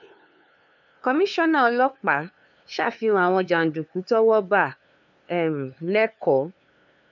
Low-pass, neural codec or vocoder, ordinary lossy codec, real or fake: 7.2 kHz; codec, 16 kHz, 2 kbps, FunCodec, trained on LibriTTS, 25 frames a second; none; fake